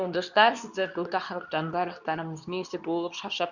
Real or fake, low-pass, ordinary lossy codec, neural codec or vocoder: fake; 7.2 kHz; none; codec, 24 kHz, 0.9 kbps, WavTokenizer, medium speech release version 2